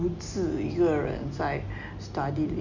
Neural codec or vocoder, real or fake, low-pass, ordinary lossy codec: none; real; 7.2 kHz; Opus, 64 kbps